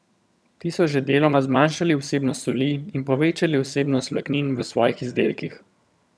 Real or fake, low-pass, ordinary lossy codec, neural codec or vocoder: fake; none; none; vocoder, 22.05 kHz, 80 mel bands, HiFi-GAN